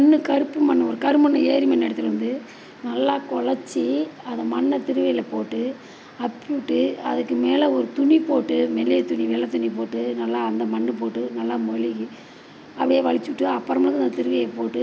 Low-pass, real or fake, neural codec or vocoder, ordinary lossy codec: none; real; none; none